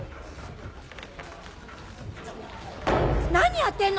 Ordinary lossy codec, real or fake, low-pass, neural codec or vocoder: none; real; none; none